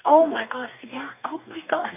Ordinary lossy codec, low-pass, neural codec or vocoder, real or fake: none; 3.6 kHz; codec, 44.1 kHz, 2.6 kbps, DAC; fake